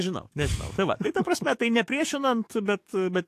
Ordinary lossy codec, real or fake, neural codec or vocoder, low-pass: AAC, 64 kbps; fake; codec, 44.1 kHz, 7.8 kbps, DAC; 14.4 kHz